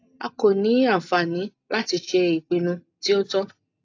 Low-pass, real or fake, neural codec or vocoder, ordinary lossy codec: 7.2 kHz; real; none; AAC, 48 kbps